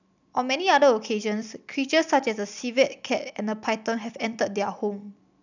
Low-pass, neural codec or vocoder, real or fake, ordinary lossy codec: 7.2 kHz; none; real; none